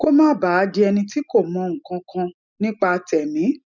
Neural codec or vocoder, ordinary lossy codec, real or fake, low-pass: none; none; real; 7.2 kHz